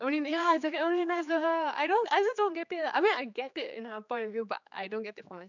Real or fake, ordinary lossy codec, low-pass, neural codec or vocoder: fake; none; 7.2 kHz; codec, 16 kHz, 4 kbps, X-Codec, HuBERT features, trained on general audio